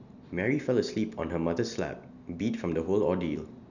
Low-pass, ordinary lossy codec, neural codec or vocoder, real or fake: 7.2 kHz; none; none; real